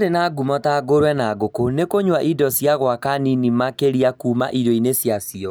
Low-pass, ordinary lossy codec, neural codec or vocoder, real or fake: none; none; none; real